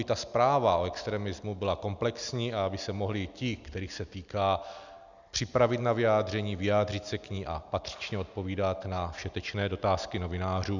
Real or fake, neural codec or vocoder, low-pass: real; none; 7.2 kHz